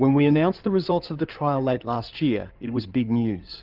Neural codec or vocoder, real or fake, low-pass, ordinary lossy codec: codec, 16 kHz in and 24 kHz out, 2.2 kbps, FireRedTTS-2 codec; fake; 5.4 kHz; Opus, 32 kbps